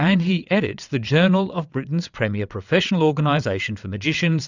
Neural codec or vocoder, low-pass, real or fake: vocoder, 22.05 kHz, 80 mel bands, WaveNeXt; 7.2 kHz; fake